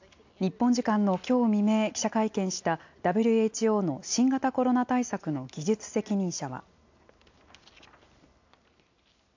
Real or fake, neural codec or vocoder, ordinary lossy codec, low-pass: real; none; AAC, 48 kbps; 7.2 kHz